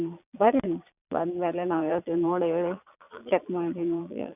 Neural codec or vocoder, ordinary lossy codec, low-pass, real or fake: vocoder, 22.05 kHz, 80 mel bands, Vocos; none; 3.6 kHz; fake